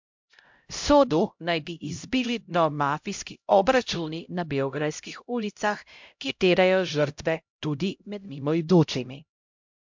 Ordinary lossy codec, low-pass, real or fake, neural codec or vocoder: MP3, 64 kbps; 7.2 kHz; fake; codec, 16 kHz, 0.5 kbps, X-Codec, HuBERT features, trained on LibriSpeech